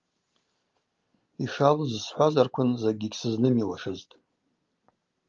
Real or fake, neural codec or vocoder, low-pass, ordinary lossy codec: real; none; 7.2 kHz; Opus, 32 kbps